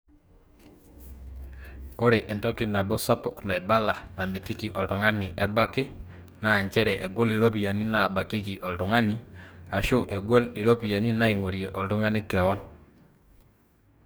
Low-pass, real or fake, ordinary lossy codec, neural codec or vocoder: none; fake; none; codec, 44.1 kHz, 2.6 kbps, DAC